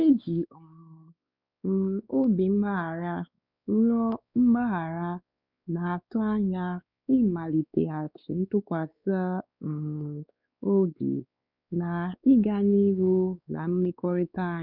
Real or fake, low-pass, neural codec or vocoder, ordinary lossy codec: fake; 5.4 kHz; codec, 16 kHz, 4 kbps, X-Codec, WavLM features, trained on Multilingual LibriSpeech; none